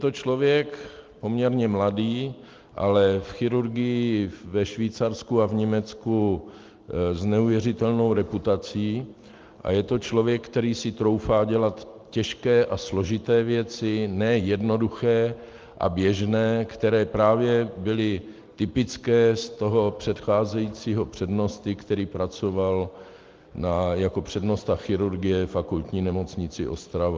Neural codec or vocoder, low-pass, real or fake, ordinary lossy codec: none; 7.2 kHz; real; Opus, 32 kbps